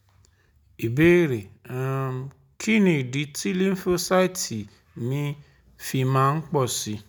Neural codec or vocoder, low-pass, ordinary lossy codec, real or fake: none; none; none; real